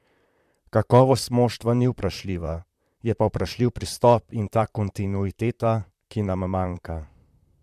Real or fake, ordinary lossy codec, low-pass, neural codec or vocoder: fake; MP3, 96 kbps; 14.4 kHz; vocoder, 44.1 kHz, 128 mel bands, Pupu-Vocoder